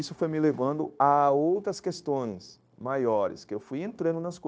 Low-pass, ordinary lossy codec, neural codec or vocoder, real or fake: none; none; codec, 16 kHz, 0.9 kbps, LongCat-Audio-Codec; fake